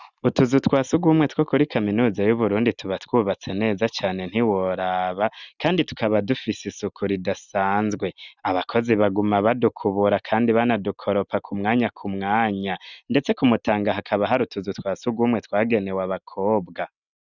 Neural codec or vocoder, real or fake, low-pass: none; real; 7.2 kHz